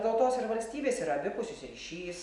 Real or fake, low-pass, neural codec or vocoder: real; 10.8 kHz; none